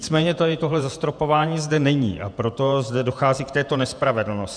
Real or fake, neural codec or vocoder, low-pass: fake; vocoder, 48 kHz, 128 mel bands, Vocos; 9.9 kHz